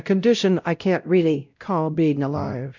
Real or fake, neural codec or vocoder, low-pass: fake; codec, 16 kHz, 0.5 kbps, X-Codec, WavLM features, trained on Multilingual LibriSpeech; 7.2 kHz